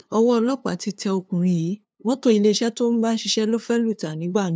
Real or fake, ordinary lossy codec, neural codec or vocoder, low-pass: fake; none; codec, 16 kHz, 2 kbps, FunCodec, trained on LibriTTS, 25 frames a second; none